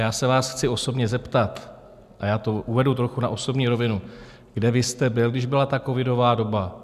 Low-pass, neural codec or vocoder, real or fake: 14.4 kHz; none; real